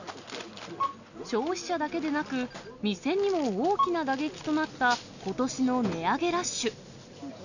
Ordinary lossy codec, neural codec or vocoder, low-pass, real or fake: none; none; 7.2 kHz; real